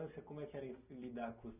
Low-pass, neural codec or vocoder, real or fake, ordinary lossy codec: 3.6 kHz; none; real; none